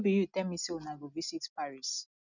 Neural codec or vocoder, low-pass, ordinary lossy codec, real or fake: none; 7.2 kHz; none; real